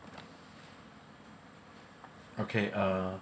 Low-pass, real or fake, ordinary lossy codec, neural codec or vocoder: none; real; none; none